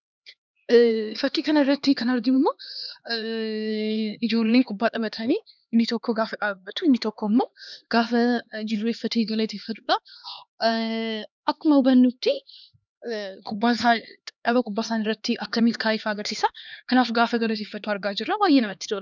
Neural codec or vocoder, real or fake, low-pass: codec, 16 kHz, 2 kbps, X-Codec, HuBERT features, trained on LibriSpeech; fake; 7.2 kHz